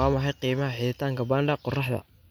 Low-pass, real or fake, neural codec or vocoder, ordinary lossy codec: none; real; none; none